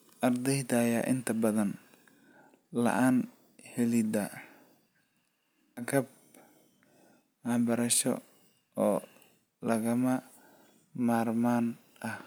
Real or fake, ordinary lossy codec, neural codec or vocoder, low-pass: real; none; none; none